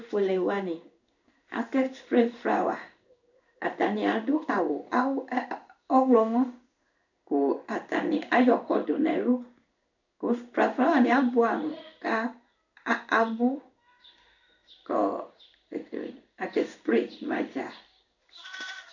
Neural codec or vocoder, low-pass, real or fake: codec, 16 kHz in and 24 kHz out, 1 kbps, XY-Tokenizer; 7.2 kHz; fake